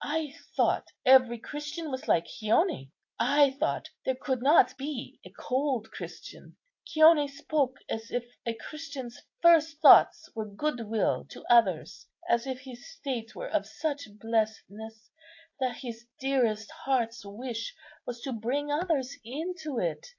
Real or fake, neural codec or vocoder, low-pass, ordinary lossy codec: real; none; 7.2 kHz; AAC, 48 kbps